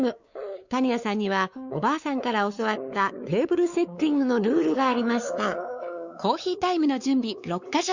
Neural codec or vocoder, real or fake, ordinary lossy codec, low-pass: codec, 16 kHz, 4 kbps, X-Codec, WavLM features, trained on Multilingual LibriSpeech; fake; Opus, 64 kbps; 7.2 kHz